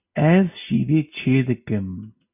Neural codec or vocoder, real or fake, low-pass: none; real; 3.6 kHz